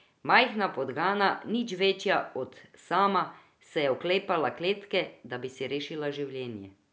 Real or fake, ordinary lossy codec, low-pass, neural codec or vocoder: real; none; none; none